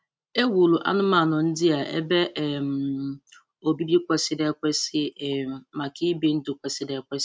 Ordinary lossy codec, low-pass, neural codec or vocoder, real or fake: none; none; none; real